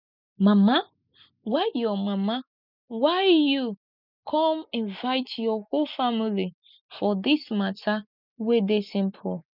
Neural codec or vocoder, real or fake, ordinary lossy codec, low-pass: none; real; none; 5.4 kHz